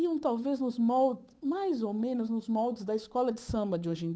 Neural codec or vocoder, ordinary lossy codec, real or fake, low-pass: codec, 16 kHz, 8 kbps, FunCodec, trained on Chinese and English, 25 frames a second; none; fake; none